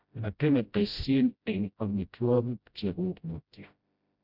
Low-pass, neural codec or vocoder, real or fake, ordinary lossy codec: 5.4 kHz; codec, 16 kHz, 0.5 kbps, FreqCodec, smaller model; fake; none